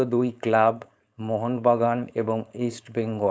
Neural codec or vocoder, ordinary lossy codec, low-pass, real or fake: codec, 16 kHz, 4.8 kbps, FACodec; none; none; fake